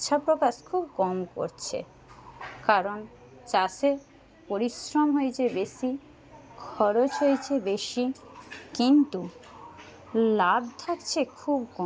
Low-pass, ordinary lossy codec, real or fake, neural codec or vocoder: none; none; real; none